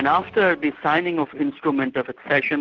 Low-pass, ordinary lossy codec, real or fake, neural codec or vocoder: 7.2 kHz; Opus, 16 kbps; real; none